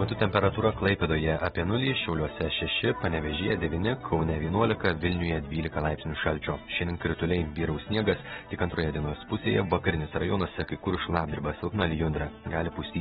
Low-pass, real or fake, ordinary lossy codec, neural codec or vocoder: 19.8 kHz; real; AAC, 16 kbps; none